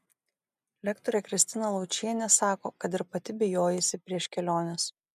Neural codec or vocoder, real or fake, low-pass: none; real; 14.4 kHz